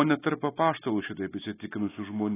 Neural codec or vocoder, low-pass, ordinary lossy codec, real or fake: none; 3.6 kHz; AAC, 16 kbps; real